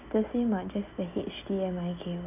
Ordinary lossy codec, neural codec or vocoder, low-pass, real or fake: none; none; 3.6 kHz; real